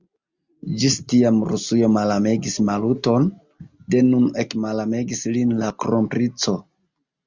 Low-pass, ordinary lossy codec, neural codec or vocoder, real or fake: 7.2 kHz; Opus, 64 kbps; none; real